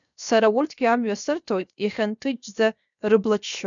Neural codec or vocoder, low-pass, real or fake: codec, 16 kHz, 0.7 kbps, FocalCodec; 7.2 kHz; fake